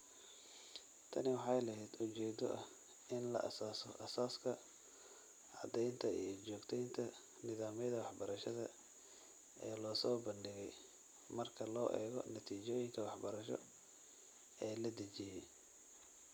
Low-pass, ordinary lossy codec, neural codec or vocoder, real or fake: none; none; none; real